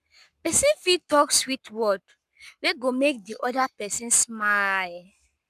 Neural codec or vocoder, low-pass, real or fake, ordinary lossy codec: codec, 44.1 kHz, 7.8 kbps, Pupu-Codec; 14.4 kHz; fake; none